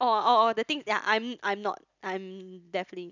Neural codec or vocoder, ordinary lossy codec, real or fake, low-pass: none; none; real; 7.2 kHz